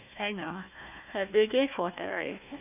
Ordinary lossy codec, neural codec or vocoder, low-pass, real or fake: none; codec, 16 kHz, 1 kbps, FunCodec, trained on Chinese and English, 50 frames a second; 3.6 kHz; fake